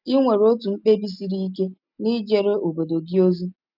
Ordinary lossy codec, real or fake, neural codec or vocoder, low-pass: none; real; none; 5.4 kHz